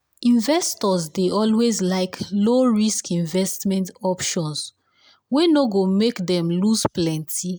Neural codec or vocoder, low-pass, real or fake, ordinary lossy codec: none; none; real; none